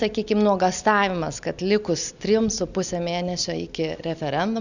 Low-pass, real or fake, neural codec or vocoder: 7.2 kHz; real; none